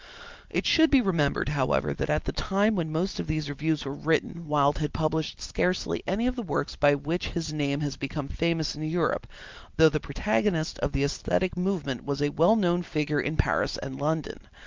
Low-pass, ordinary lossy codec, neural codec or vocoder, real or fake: 7.2 kHz; Opus, 24 kbps; none; real